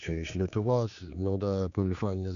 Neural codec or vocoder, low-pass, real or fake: codec, 16 kHz, 2 kbps, X-Codec, HuBERT features, trained on general audio; 7.2 kHz; fake